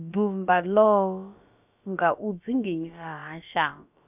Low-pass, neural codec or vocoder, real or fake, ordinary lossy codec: 3.6 kHz; codec, 16 kHz, about 1 kbps, DyCAST, with the encoder's durations; fake; none